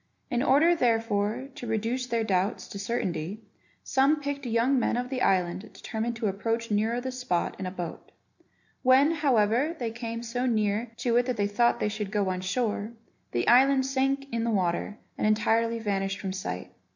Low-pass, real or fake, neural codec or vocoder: 7.2 kHz; real; none